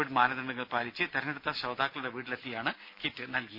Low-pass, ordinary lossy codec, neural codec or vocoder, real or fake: 5.4 kHz; none; none; real